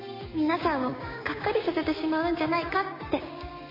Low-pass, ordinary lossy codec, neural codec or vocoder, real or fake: 5.4 kHz; MP3, 24 kbps; codec, 16 kHz, 6 kbps, DAC; fake